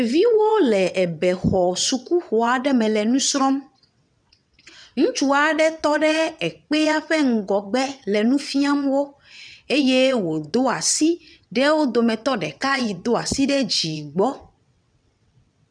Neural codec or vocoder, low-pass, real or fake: vocoder, 22.05 kHz, 80 mel bands, WaveNeXt; 9.9 kHz; fake